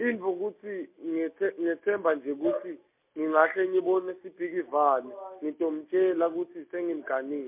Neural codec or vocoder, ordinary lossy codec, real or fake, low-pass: none; MP3, 32 kbps; real; 3.6 kHz